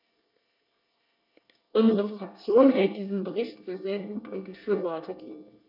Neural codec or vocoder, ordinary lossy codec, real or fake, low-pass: codec, 24 kHz, 1 kbps, SNAC; none; fake; 5.4 kHz